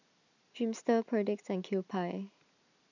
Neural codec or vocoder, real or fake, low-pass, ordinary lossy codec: none; real; 7.2 kHz; none